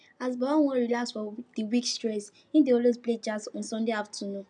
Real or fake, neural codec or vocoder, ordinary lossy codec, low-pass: real; none; none; 9.9 kHz